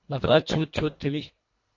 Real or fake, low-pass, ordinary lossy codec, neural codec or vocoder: fake; 7.2 kHz; MP3, 48 kbps; codec, 24 kHz, 1.5 kbps, HILCodec